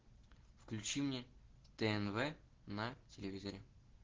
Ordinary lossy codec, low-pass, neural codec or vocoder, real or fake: Opus, 16 kbps; 7.2 kHz; none; real